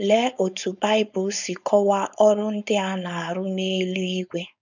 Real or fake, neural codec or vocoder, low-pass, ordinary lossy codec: fake; codec, 16 kHz, 4.8 kbps, FACodec; 7.2 kHz; none